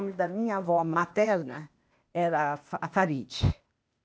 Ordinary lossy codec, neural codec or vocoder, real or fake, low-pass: none; codec, 16 kHz, 0.8 kbps, ZipCodec; fake; none